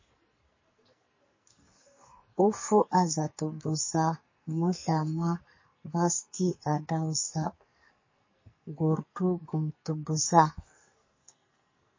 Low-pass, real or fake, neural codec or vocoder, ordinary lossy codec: 7.2 kHz; fake; codec, 44.1 kHz, 2.6 kbps, SNAC; MP3, 32 kbps